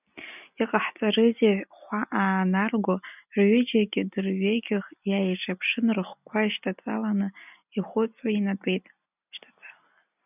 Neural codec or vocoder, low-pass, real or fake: none; 3.6 kHz; real